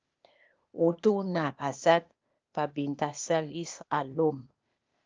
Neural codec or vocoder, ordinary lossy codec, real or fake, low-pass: codec, 16 kHz, 0.8 kbps, ZipCodec; Opus, 24 kbps; fake; 7.2 kHz